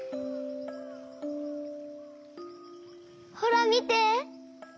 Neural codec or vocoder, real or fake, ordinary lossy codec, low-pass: none; real; none; none